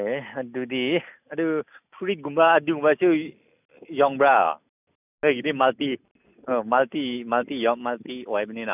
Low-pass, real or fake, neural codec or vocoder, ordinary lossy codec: 3.6 kHz; real; none; none